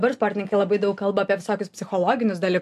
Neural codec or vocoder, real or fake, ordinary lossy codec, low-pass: none; real; MP3, 64 kbps; 14.4 kHz